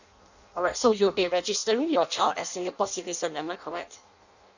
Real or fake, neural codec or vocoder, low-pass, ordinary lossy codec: fake; codec, 16 kHz in and 24 kHz out, 0.6 kbps, FireRedTTS-2 codec; 7.2 kHz; none